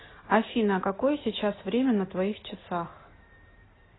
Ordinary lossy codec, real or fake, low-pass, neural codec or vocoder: AAC, 16 kbps; real; 7.2 kHz; none